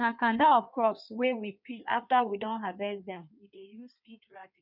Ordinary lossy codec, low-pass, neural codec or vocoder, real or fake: none; 5.4 kHz; codec, 16 kHz in and 24 kHz out, 1.1 kbps, FireRedTTS-2 codec; fake